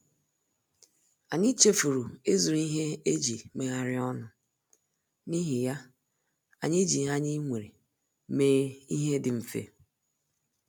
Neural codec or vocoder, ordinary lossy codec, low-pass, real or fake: none; none; none; real